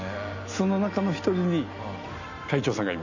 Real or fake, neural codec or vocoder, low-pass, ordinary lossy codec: real; none; 7.2 kHz; none